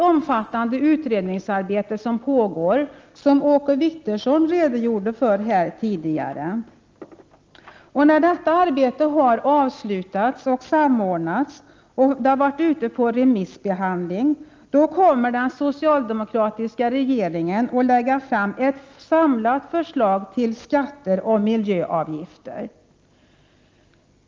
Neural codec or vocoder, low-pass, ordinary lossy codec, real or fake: none; 7.2 kHz; Opus, 24 kbps; real